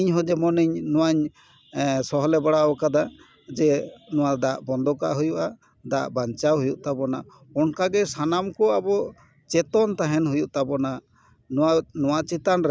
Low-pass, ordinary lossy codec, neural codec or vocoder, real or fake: none; none; none; real